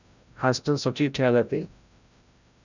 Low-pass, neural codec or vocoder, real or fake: 7.2 kHz; codec, 16 kHz, 0.5 kbps, FreqCodec, larger model; fake